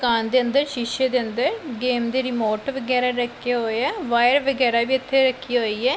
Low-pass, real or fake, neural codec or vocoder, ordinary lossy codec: none; real; none; none